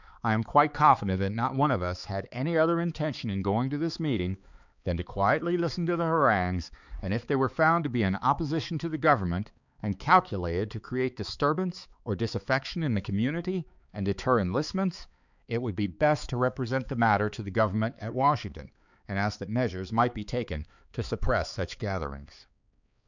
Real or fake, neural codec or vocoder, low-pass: fake; codec, 16 kHz, 4 kbps, X-Codec, HuBERT features, trained on balanced general audio; 7.2 kHz